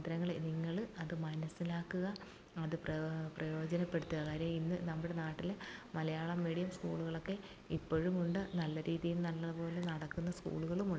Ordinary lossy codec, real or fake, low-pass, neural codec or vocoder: none; real; none; none